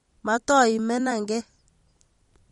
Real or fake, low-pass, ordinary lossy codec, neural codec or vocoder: fake; 19.8 kHz; MP3, 48 kbps; vocoder, 44.1 kHz, 128 mel bands every 256 samples, BigVGAN v2